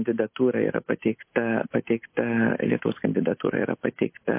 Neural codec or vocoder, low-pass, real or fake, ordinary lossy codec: none; 3.6 kHz; real; MP3, 24 kbps